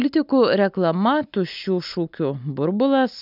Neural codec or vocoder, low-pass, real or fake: none; 5.4 kHz; real